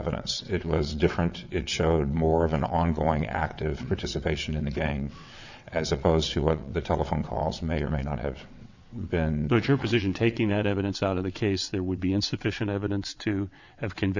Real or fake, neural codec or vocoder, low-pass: fake; vocoder, 22.05 kHz, 80 mel bands, WaveNeXt; 7.2 kHz